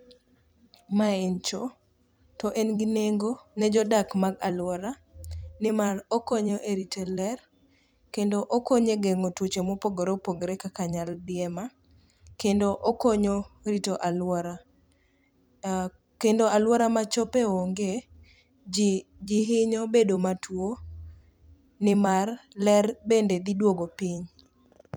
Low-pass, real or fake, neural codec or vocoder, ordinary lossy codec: none; fake; vocoder, 44.1 kHz, 128 mel bands every 256 samples, BigVGAN v2; none